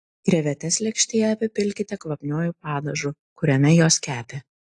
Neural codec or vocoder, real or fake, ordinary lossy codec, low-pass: none; real; AAC, 48 kbps; 10.8 kHz